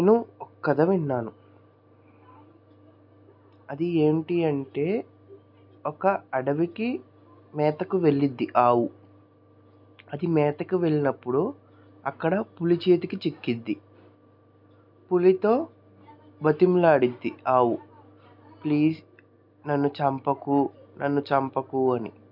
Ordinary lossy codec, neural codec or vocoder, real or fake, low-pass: none; none; real; 5.4 kHz